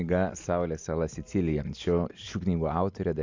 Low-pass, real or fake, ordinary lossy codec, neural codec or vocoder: 7.2 kHz; real; MP3, 64 kbps; none